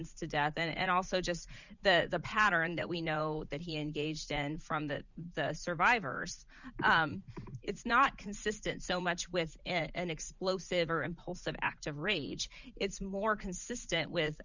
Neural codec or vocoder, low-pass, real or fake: vocoder, 44.1 kHz, 80 mel bands, Vocos; 7.2 kHz; fake